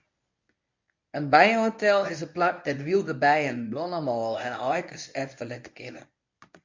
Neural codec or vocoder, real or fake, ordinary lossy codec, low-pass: codec, 24 kHz, 0.9 kbps, WavTokenizer, medium speech release version 1; fake; MP3, 48 kbps; 7.2 kHz